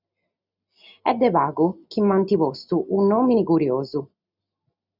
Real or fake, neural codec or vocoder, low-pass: real; none; 5.4 kHz